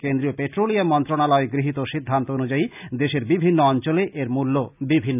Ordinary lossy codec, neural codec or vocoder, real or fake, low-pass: none; none; real; 3.6 kHz